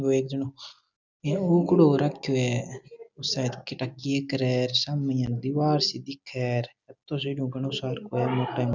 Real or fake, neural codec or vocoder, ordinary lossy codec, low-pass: real; none; none; 7.2 kHz